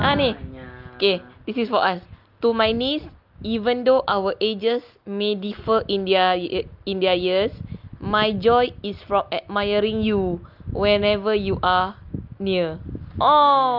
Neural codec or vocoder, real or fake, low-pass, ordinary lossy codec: none; real; 5.4 kHz; Opus, 32 kbps